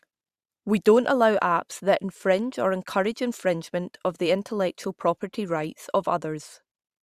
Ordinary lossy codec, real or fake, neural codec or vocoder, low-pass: Opus, 64 kbps; fake; vocoder, 44.1 kHz, 128 mel bands every 512 samples, BigVGAN v2; 14.4 kHz